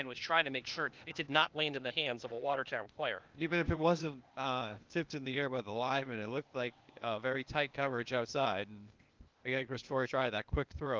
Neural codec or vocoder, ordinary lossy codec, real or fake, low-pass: codec, 16 kHz, 0.8 kbps, ZipCodec; Opus, 24 kbps; fake; 7.2 kHz